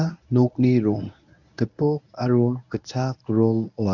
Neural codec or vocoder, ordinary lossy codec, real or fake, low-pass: codec, 24 kHz, 0.9 kbps, WavTokenizer, medium speech release version 1; none; fake; 7.2 kHz